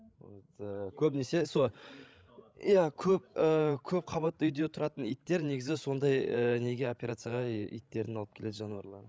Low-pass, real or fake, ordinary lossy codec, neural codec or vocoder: none; fake; none; codec, 16 kHz, 16 kbps, FreqCodec, larger model